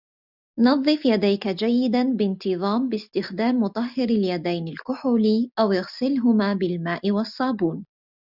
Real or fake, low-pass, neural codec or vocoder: real; 5.4 kHz; none